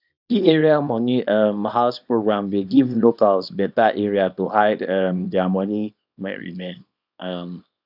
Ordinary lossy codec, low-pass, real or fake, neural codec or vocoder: none; 5.4 kHz; fake; codec, 24 kHz, 0.9 kbps, WavTokenizer, small release